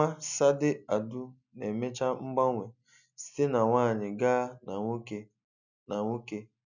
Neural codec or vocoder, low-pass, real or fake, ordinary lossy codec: none; 7.2 kHz; real; none